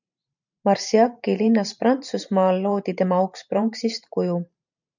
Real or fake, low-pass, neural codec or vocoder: fake; 7.2 kHz; vocoder, 44.1 kHz, 128 mel bands every 512 samples, BigVGAN v2